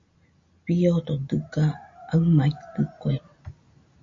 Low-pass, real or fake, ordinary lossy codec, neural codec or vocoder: 7.2 kHz; real; MP3, 48 kbps; none